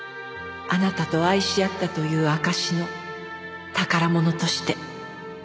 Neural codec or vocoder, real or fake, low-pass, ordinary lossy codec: none; real; none; none